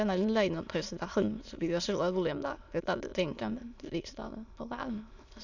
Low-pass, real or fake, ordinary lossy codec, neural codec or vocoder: 7.2 kHz; fake; none; autoencoder, 22.05 kHz, a latent of 192 numbers a frame, VITS, trained on many speakers